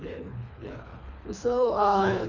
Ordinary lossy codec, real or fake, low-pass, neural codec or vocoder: none; fake; 7.2 kHz; codec, 24 kHz, 3 kbps, HILCodec